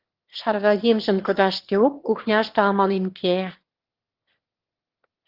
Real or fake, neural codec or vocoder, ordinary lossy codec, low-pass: fake; autoencoder, 22.05 kHz, a latent of 192 numbers a frame, VITS, trained on one speaker; Opus, 16 kbps; 5.4 kHz